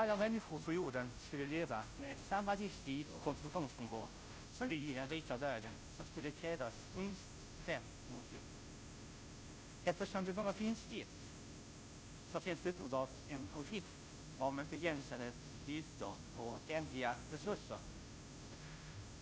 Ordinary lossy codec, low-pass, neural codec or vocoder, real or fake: none; none; codec, 16 kHz, 0.5 kbps, FunCodec, trained on Chinese and English, 25 frames a second; fake